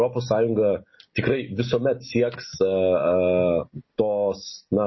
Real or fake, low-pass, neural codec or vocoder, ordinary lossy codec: real; 7.2 kHz; none; MP3, 24 kbps